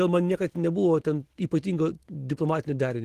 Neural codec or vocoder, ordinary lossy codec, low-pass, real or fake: autoencoder, 48 kHz, 128 numbers a frame, DAC-VAE, trained on Japanese speech; Opus, 16 kbps; 14.4 kHz; fake